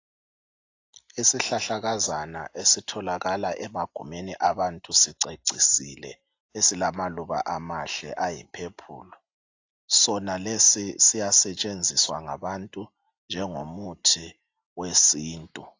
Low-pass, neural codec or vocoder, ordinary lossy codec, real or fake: 7.2 kHz; none; AAC, 48 kbps; real